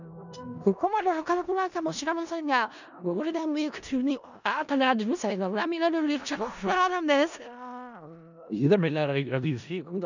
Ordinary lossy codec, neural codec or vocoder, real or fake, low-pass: none; codec, 16 kHz in and 24 kHz out, 0.4 kbps, LongCat-Audio-Codec, four codebook decoder; fake; 7.2 kHz